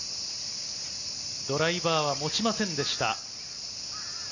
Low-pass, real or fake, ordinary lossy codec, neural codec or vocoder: 7.2 kHz; real; none; none